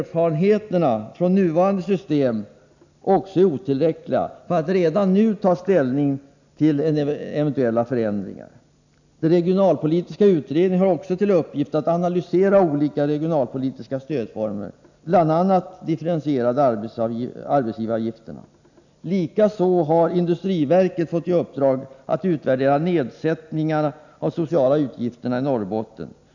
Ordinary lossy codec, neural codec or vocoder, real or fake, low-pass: none; none; real; 7.2 kHz